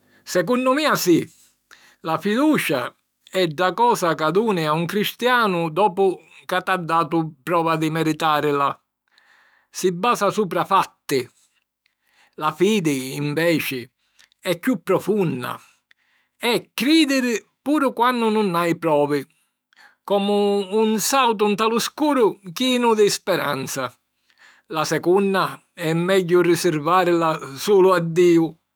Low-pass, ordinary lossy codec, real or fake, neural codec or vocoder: none; none; fake; autoencoder, 48 kHz, 128 numbers a frame, DAC-VAE, trained on Japanese speech